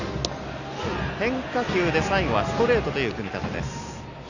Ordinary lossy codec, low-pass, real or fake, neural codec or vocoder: none; 7.2 kHz; real; none